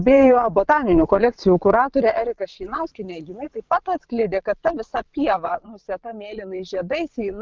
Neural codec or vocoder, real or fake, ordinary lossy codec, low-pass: vocoder, 22.05 kHz, 80 mel bands, WaveNeXt; fake; Opus, 24 kbps; 7.2 kHz